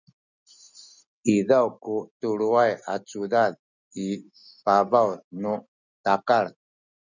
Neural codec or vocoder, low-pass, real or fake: none; 7.2 kHz; real